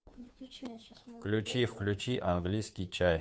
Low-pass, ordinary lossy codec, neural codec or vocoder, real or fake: none; none; codec, 16 kHz, 2 kbps, FunCodec, trained on Chinese and English, 25 frames a second; fake